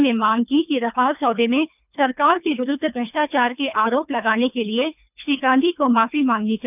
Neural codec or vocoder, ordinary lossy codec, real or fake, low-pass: codec, 24 kHz, 3 kbps, HILCodec; none; fake; 3.6 kHz